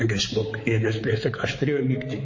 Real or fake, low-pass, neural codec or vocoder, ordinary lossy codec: fake; 7.2 kHz; codec, 16 kHz, 4 kbps, X-Codec, HuBERT features, trained on balanced general audio; MP3, 32 kbps